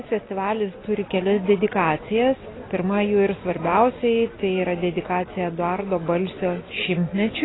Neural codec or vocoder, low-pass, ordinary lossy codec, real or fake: none; 7.2 kHz; AAC, 16 kbps; real